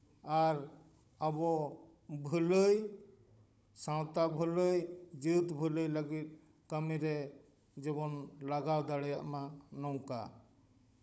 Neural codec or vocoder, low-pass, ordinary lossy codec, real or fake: codec, 16 kHz, 16 kbps, FunCodec, trained on Chinese and English, 50 frames a second; none; none; fake